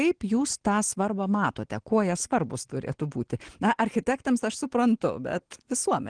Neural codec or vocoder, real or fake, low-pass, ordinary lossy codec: none; real; 9.9 kHz; Opus, 16 kbps